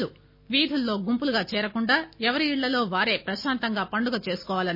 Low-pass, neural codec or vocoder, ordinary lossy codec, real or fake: 5.4 kHz; none; MP3, 24 kbps; real